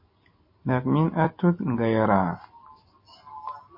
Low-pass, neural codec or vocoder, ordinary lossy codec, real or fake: 5.4 kHz; none; MP3, 24 kbps; real